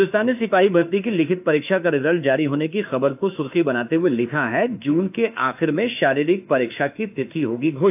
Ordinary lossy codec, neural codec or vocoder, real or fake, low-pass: AAC, 32 kbps; autoencoder, 48 kHz, 32 numbers a frame, DAC-VAE, trained on Japanese speech; fake; 3.6 kHz